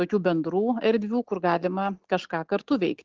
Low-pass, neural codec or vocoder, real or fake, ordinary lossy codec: 7.2 kHz; none; real; Opus, 16 kbps